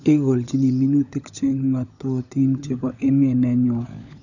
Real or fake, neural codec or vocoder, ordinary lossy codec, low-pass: fake; codec, 16 kHz, 16 kbps, FunCodec, trained on LibriTTS, 50 frames a second; none; 7.2 kHz